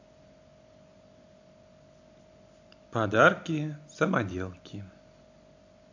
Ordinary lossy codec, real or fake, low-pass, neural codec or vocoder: AAC, 48 kbps; real; 7.2 kHz; none